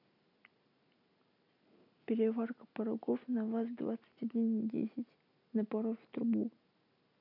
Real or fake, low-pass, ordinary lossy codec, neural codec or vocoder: real; 5.4 kHz; none; none